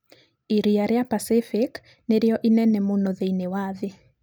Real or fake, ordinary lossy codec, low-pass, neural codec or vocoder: real; none; none; none